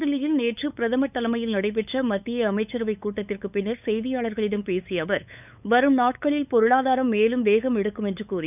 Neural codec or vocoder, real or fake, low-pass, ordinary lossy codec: codec, 16 kHz, 8 kbps, FunCodec, trained on LibriTTS, 25 frames a second; fake; 3.6 kHz; none